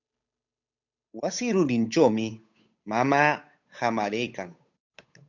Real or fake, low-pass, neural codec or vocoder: fake; 7.2 kHz; codec, 16 kHz, 8 kbps, FunCodec, trained on Chinese and English, 25 frames a second